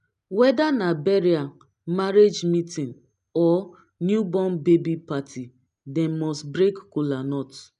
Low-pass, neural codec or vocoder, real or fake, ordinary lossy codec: 10.8 kHz; none; real; none